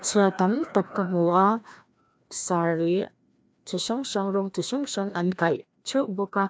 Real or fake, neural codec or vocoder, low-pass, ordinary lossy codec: fake; codec, 16 kHz, 1 kbps, FreqCodec, larger model; none; none